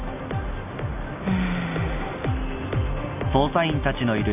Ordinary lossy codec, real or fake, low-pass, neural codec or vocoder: none; real; 3.6 kHz; none